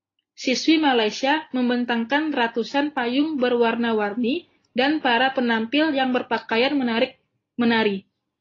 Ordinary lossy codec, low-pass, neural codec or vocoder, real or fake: AAC, 32 kbps; 7.2 kHz; none; real